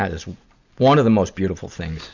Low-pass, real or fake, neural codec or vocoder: 7.2 kHz; real; none